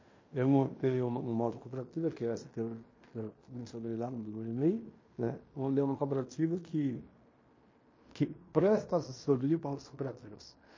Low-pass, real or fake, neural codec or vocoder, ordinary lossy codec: 7.2 kHz; fake; codec, 16 kHz in and 24 kHz out, 0.9 kbps, LongCat-Audio-Codec, fine tuned four codebook decoder; MP3, 32 kbps